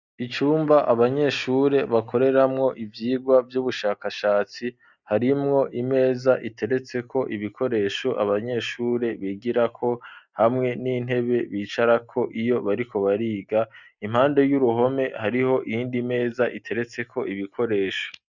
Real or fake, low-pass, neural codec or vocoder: real; 7.2 kHz; none